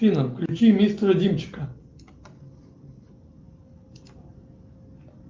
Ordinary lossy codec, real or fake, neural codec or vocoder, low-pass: Opus, 24 kbps; real; none; 7.2 kHz